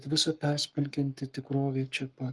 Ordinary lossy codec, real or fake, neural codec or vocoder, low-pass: Opus, 24 kbps; fake; codec, 44.1 kHz, 7.8 kbps, Pupu-Codec; 10.8 kHz